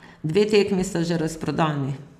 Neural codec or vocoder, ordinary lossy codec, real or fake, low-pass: none; none; real; 14.4 kHz